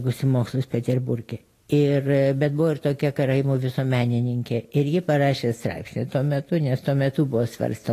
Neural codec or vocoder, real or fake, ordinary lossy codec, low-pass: none; real; AAC, 48 kbps; 14.4 kHz